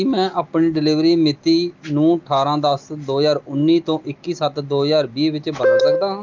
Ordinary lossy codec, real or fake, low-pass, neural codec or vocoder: Opus, 24 kbps; real; 7.2 kHz; none